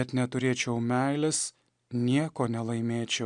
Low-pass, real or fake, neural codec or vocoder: 9.9 kHz; real; none